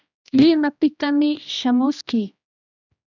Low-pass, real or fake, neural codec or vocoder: 7.2 kHz; fake; codec, 16 kHz, 1 kbps, X-Codec, HuBERT features, trained on general audio